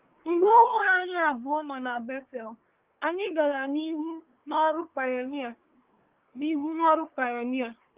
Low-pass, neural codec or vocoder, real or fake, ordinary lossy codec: 3.6 kHz; codec, 24 kHz, 1 kbps, SNAC; fake; Opus, 24 kbps